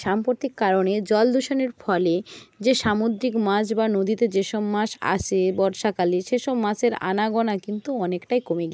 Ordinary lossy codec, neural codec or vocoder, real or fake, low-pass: none; none; real; none